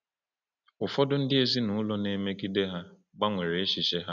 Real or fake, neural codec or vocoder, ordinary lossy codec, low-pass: real; none; none; 7.2 kHz